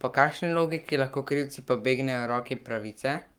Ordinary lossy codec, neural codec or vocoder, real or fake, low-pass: Opus, 32 kbps; codec, 44.1 kHz, 7.8 kbps, Pupu-Codec; fake; 19.8 kHz